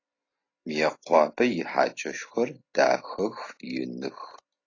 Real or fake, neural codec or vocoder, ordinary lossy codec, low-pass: real; none; AAC, 32 kbps; 7.2 kHz